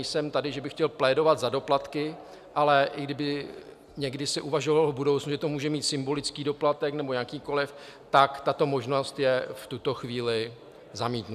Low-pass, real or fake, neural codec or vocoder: 14.4 kHz; real; none